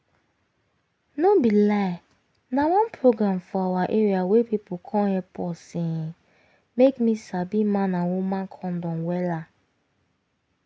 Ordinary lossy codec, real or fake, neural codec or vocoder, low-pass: none; real; none; none